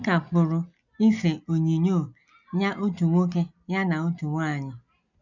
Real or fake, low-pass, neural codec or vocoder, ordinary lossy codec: real; 7.2 kHz; none; none